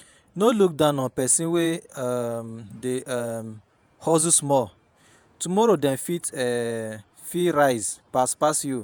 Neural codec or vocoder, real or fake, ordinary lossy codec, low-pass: vocoder, 48 kHz, 128 mel bands, Vocos; fake; none; none